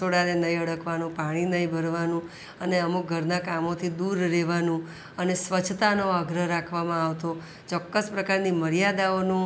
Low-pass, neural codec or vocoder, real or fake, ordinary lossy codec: none; none; real; none